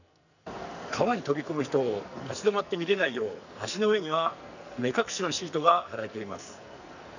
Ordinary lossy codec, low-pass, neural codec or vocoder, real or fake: none; 7.2 kHz; codec, 44.1 kHz, 2.6 kbps, SNAC; fake